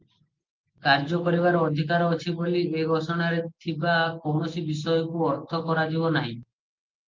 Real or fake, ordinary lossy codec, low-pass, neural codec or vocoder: real; Opus, 32 kbps; 7.2 kHz; none